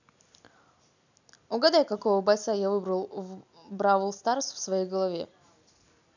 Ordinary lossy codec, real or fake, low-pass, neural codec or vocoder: none; real; 7.2 kHz; none